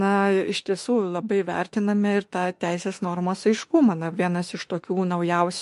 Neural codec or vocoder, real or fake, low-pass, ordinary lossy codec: autoencoder, 48 kHz, 32 numbers a frame, DAC-VAE, trained on Japanese speech; fake; 14.4 kHz; MP3, 48 kbps